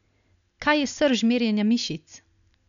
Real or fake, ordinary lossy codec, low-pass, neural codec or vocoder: real; none; 7.2 kHz; none